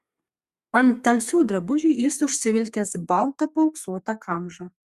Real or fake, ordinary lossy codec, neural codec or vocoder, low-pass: fake; Opus, 64 kbps; codec, 32 kHz, 1.9 kbps, SNAC; 14.4 kHz